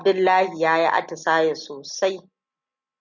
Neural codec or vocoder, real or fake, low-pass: none; real; 7.2 kHz